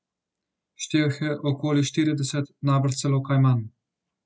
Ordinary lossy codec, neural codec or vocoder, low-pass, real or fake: none; none; none; real